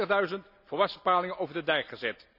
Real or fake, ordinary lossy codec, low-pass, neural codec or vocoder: real; none; 5.4 kHz; none